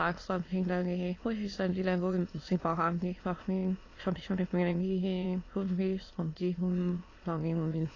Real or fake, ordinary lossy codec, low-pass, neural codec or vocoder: fake; AAC, 32 kbps; 7.2 kHz; autoencoder, 22.05 kHz, a latent of 192 numbers a frame, VITS, trained on many speakers